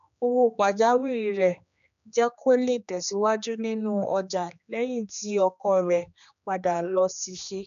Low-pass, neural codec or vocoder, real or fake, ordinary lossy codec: 7.2 kHz; codec, 16 kHz, 2 kbps, X-Codec, HuBERT features, trained on general audio; fake; none